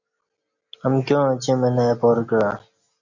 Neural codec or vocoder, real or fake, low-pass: none; real; 7.2 kHz